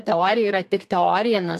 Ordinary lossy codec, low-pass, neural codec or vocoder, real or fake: AAC, 64 kbps; 14.4 kHz; codec, 32 kHz, 1.9 kbps, SNAC; fake